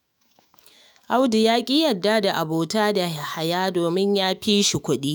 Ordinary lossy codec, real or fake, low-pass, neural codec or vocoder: none; fake; none; autoencoder, 48 kHz, 128 numbers a frame, DAC-VAE, trained on Japanese speech